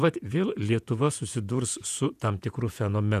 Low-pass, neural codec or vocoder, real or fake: 14.4 kHz; vocoder, 48 kHz, 128 mel bands, Vocos; fake